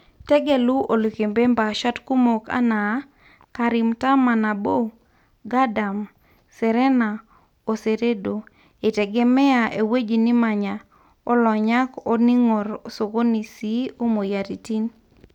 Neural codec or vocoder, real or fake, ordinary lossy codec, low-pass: none; real; none; 19.8 kHz